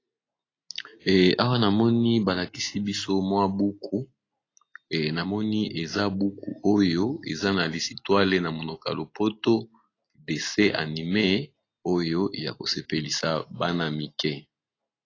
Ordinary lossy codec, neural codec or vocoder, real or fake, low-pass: AAC, 32 kbps; none; real; 7.2 kHz